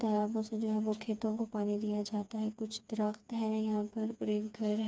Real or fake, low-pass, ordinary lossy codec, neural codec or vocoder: fake; none; none; codec, 16 kHz, 4 kbps, FreqCodec, smaller model